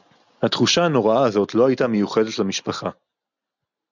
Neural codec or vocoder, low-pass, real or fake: none; 7.2 kHz; real